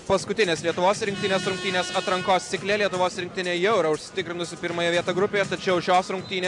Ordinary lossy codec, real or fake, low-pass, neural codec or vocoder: MP3, 96 kbps; real; 10.8 kHz; none